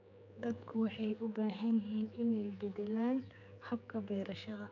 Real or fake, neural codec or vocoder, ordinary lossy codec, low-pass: fake; codec, 16 kHz, 4 kbps, X-Codec, HuBERT features, trained on general audio; none; 7.2 kHz